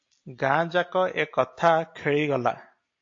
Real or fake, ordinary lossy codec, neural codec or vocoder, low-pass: real; AAC, 48 kbps; none; 7.2 kHz